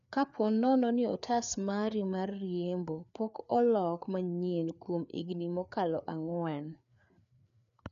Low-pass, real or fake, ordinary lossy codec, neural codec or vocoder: 7.2 kHz; fake; MP3, 96 kbps; codec, 16 kHz, 4 kbps, FreqCodec, larger model